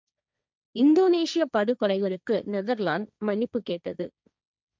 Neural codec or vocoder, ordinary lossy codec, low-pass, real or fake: codec, 16 kHz, 1.1 kbps, Voila-Tokenizer; none; none; fake